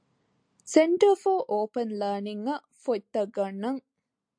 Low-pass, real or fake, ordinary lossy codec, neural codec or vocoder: 9.9 kHz; real; AAC, 64 kbps; none